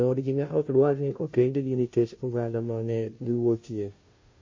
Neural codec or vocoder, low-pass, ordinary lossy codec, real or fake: codec, 16 kHz, 0.5 kbps, FunCodec, trained on Chinese and English, 25 frames a second; 7.2 kHz; MP3, 32 kbps; fake